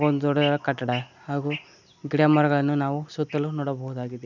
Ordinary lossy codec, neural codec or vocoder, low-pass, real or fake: none; none; 7.2 kHz; real